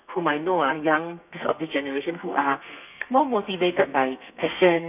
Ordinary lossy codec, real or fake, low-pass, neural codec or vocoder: none; fake; 3.6 kHz; codec, 32 kHz, 1.9 kbps, SNAC